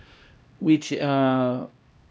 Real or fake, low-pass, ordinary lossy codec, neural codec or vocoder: fake; none; none; codec, 16 kHz, 1 kbps, X-Codec, HuBERT features, trained on LibriSpeech